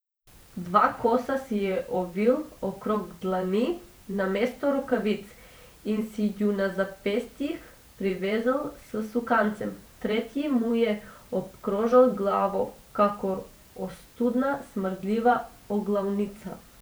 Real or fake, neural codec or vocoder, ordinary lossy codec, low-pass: fake; vocoder, 44.1 kHz, 128 mel bands every 512 samples, BigVGAN v2; none; none